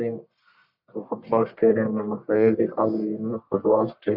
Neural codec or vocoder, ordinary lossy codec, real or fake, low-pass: codec, 44.1 kHz, 1.7 kbps, Pupu-Codec; none; fake; 5.4 kHz